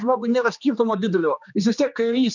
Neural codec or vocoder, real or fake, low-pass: codec, 16 kHz, 2 kbps, X-Codec, HuBERT features, trained on general audio; fake; 7.2 kHz